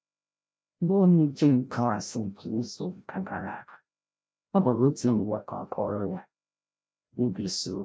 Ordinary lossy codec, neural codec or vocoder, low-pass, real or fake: none; codec, 16 kHz, 0.5 kbps, FreqCodec, larger model; none; fake